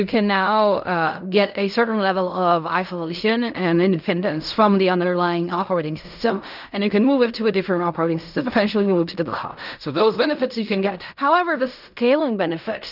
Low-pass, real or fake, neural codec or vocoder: 5.4 kHz; fake; codec, 16 kHz in and 24 kHz out, 0.4 kbps, LongCat-Audio-Codec, fine tuned four codebook decoder